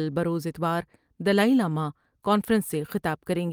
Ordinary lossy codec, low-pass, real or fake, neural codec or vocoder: Opus, 32 kbps; 19.8 kHz; real; none